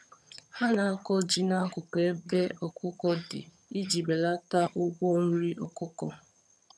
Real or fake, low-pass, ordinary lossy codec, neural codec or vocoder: fake; none; none; vocoder, 22.05 kHz, 80 mel bands, HiFi-GAN